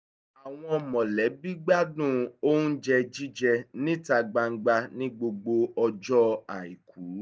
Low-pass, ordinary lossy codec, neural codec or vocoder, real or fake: none; none; none; real